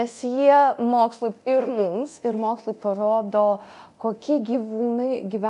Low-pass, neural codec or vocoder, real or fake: 10.8 kHz; codec, 24 kHz, 0.9 kbps, DualCodec; fake